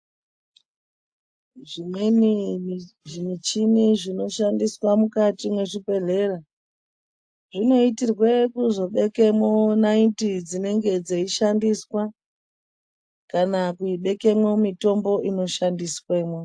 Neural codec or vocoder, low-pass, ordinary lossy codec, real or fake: none; 9.9 kHz; AAC, 64 kbps; real